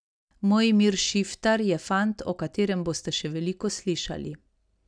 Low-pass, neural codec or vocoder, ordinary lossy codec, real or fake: 9.9 kHz; none; none; real